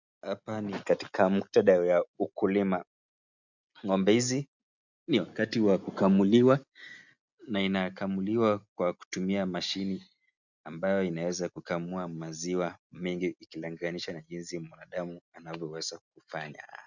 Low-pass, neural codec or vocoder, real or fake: 7.2 kHz; none; real